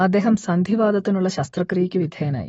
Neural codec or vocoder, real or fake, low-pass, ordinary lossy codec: autoencoder, 48 kHz, 128 numbers a frame, DAC-VAE, trained on Japanese speech; fake; 19.8 kHz; AAC, 24 kbps